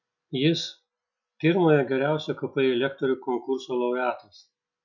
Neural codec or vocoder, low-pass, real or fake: none; 7.2 kHz; real